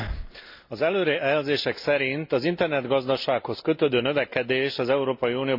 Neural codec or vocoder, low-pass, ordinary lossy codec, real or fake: none; 5.4 kHz; MP3, 48 kbps; real